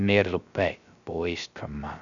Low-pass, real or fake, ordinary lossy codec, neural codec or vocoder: 7.2 kHz; fake; none; codec, 16 kHz, 0.3 kbps, FocalCodec